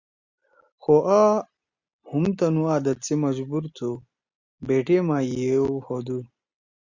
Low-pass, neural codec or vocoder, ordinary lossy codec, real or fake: 7.2 kHz; none; Opus, 64 kbps; real